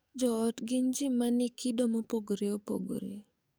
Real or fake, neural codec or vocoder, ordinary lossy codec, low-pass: fake; codec, 44.1 kHz, 7.8 kbps, DAC; none; none